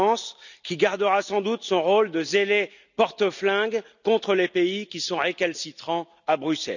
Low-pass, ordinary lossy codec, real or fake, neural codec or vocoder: 7.2 kHz; none; real; none